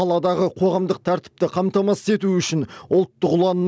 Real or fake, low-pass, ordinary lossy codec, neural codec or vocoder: real; none; none; none